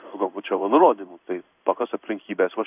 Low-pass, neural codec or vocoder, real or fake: 3.6 kHz; codec, 16 kHz in and 24 kHz out, 1 kbps, XY-Tokenizer; fake